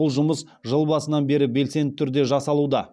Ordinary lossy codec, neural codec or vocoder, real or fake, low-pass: none; none; real; none